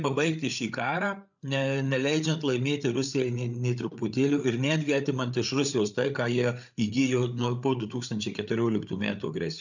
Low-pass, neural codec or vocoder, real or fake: 7.2 kHz; codec, 16 kHz, 8 kbps, FreqCodec, larger model; fake